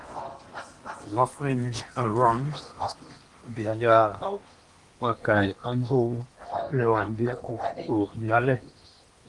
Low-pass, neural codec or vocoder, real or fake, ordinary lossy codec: 10.8 kHz; codec, 16 kHz in and 24 kHz out, 0.8 kbps, FocalCodec, streaming, 65536 codes; fake; Opus, 24 kbps